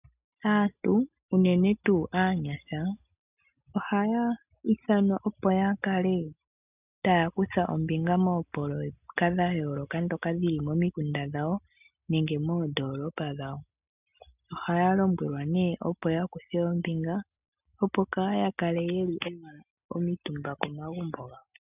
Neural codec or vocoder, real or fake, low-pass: none; real; 3.6 kHz